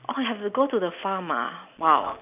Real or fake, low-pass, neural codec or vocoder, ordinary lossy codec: real; 3.6 kHz; none; none